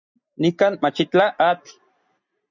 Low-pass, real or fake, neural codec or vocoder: 7.2 kHz; real; none